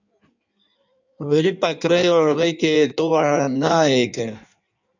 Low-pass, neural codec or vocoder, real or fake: 7.2 kHz; codec, 16 kHz in and 24 kHz out, 1.1 kbps, FireRedTTS-2 codec; fake